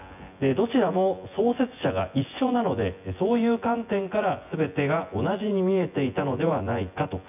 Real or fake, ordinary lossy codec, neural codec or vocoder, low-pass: fake; none; vocoder, 24 kHz, 100 mel bands, Vocos; 3.6 kHz